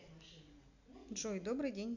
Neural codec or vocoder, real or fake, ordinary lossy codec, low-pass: none; real; none; 7.2 kHz